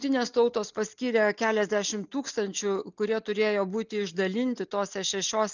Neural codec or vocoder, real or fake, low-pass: none; real; 7.2 kHz